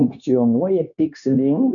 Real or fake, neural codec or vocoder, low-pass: fake; codec, 16 kHz, 0.9 kbps, LongCat-Audio-Codec; 7.2 kHz